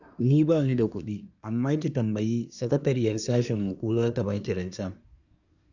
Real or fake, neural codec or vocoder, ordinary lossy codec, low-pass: fake; codec, 24 kHz, 1 kbps, SNAC; none; 7.2 kHz